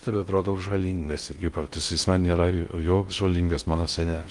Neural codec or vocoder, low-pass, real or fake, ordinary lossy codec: codec, 16 kHz in and 24 kHz out, 0.6 kbps, FocalCodec, streaming, 2048 codes; 10.8 kHz; fake; Opus, 32 kbps